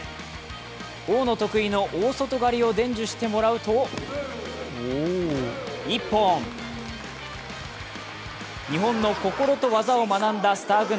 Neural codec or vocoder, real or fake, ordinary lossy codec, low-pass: none; real; none; none